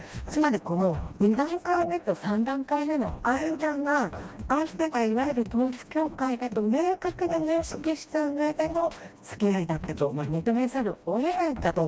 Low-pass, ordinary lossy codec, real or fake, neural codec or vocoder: none; none; fake; codec, 16 kHz, 1 kbps, FreqCodec, smaller model